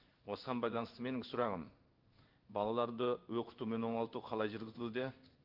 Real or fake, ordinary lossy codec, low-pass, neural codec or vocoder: fake; Opus, 24 kbps; 5.4 kHz; codec, 16 kHz in and 24 kHz out, 1 kbps, XY-Tokenizer